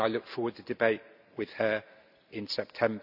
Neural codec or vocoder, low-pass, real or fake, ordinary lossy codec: none; 5.4 kHz; real; none